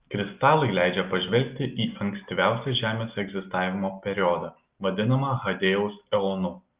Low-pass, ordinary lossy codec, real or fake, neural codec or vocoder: 3.6 kHz; Opus, 24 kbps; real; none